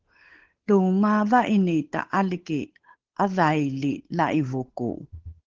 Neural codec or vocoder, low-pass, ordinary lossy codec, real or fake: codec, 16 kHz, 8 kbps, FunCodec, trained on Chinese and English, 25 frames a second; 7.2 kHz; Opus, 16 kbps; fake